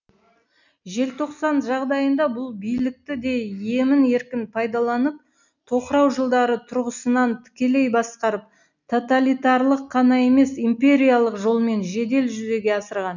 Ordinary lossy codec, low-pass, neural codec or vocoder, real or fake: none; 7.2 kHz; none; real